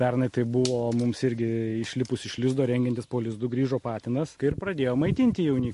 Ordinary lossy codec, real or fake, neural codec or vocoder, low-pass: MP3, 48 kbps; real; none; 14.4 kHz